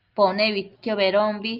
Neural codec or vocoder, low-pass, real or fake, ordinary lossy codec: none; 5.4 kHz; real; Opus, 24 kbps